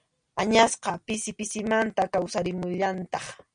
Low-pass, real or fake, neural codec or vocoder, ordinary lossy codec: 9.9 kHz; real; none; MP3, 96 kbps